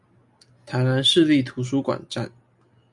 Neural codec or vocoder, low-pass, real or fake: none; 10.8 kHz; real